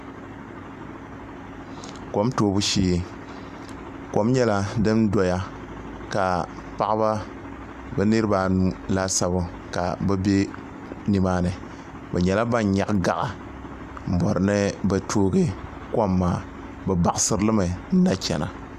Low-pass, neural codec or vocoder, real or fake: 14.4 kHz; none; real